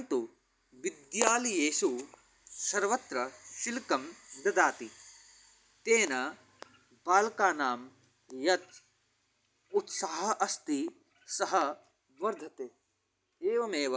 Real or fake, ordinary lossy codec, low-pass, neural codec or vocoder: real; none; none; none